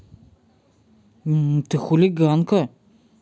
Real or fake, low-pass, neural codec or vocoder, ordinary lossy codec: real; none; none; none